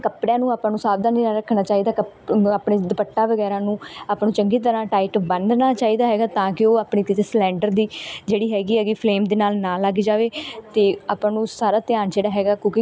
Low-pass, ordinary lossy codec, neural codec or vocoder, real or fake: none; none; none; real